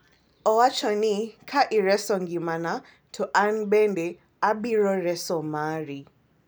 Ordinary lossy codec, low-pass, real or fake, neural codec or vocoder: none; none; real; none